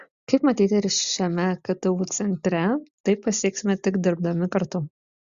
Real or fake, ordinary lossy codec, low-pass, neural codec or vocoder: real; Opus, 64 kbps; 7.2 kHz; none